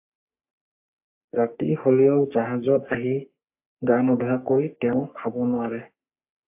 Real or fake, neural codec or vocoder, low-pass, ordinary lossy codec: fake; codec, 44.1 kHz, 3.4 kbps, Pupu-Codec; 3.6 kHz; AAC, 32 kbps